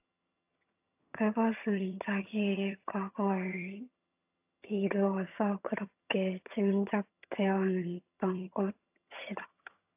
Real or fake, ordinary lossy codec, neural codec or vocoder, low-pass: fake; AAC, 32 kbps; vocoder, 22.05 kHz, 80 mel bands, HiFi-GAN; 3.6 kHz